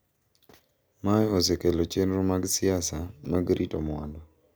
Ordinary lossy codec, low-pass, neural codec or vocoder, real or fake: none; none; none; real